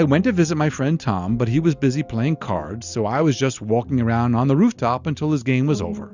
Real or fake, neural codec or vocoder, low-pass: real; none; 7.2 kHz